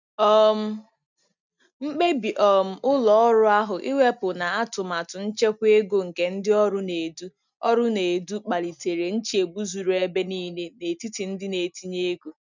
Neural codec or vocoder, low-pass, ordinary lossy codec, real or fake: none; 7.2 kHz; none; real